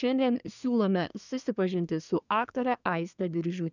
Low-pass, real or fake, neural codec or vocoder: 7.2 kHz; fake; codec, 24 kHz, 1 kbps, SNAC